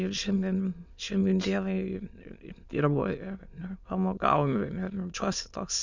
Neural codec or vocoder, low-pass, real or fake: autoencoder, 22.05 kHz, a latent of 192 numbers a frame, VITS, trained on many speakers; 7.2 kHz; fake